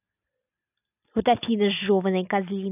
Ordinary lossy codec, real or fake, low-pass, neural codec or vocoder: none; real; 3.6 kHz; none